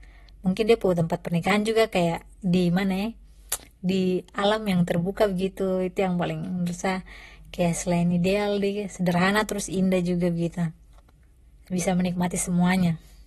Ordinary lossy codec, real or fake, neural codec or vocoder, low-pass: AAC, 32 kbps; real; none; 19.8 kHz